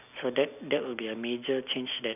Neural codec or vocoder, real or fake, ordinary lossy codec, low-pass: none; real; none; 3.6 kHz